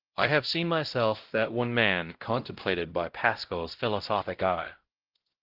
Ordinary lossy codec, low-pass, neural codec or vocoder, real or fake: Opus, 16 kbps; 5.4 kHz; codec, 16 kHz, 0.5 kbps, X-Codec, WavLM features, trained on Multilingual LibriSpeech; fake